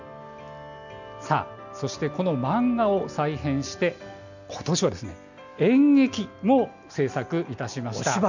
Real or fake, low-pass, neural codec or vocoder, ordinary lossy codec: real; 7.2 kHz; none; none